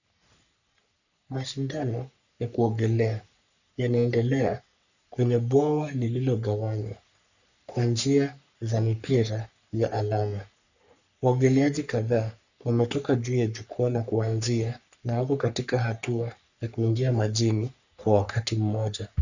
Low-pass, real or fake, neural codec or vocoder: 7.2 kHz; fake; codec, 44.1 kHz, 3.4 kbps, Pupu-Codec